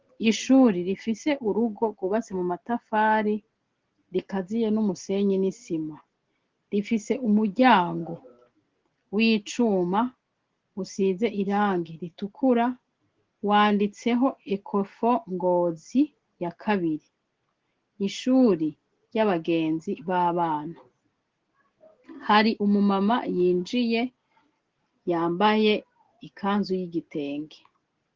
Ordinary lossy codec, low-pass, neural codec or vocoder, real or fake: Opus, 16 kbps; 7.2 kHz; none; real